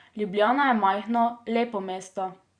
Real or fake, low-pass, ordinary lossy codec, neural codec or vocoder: fake; 9.9 kHz; Opus, 64 kbps; vocoder, 44.1 kHz, 128 mel bands every 512 samples, BigVGAN v2